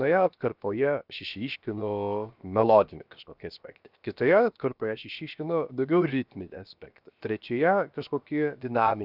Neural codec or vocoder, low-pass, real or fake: codec, 16 kHz, 0.7 kbps, FocalCodec; 5.4 kHz; fake